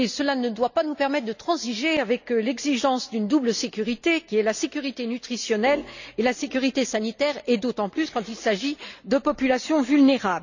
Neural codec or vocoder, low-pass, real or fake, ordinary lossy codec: none; 7.2 kHz; real; none